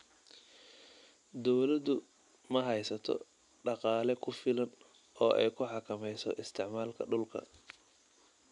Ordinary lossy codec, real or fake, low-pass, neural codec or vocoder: none; real; 10.8 kHz; none